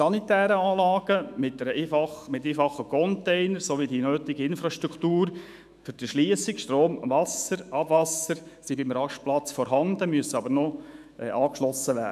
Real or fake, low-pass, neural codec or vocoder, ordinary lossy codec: fake; 14.4 kHz; autoencoder, 48 kHz, 128 numbers a frame, DAC-VAE, trained on Japanese speech; none